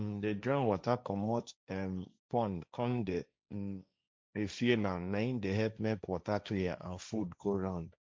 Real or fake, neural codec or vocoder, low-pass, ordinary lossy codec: fake; codec, 16 kHz, 1.1 kbps, Voila-Tokenizer; none; none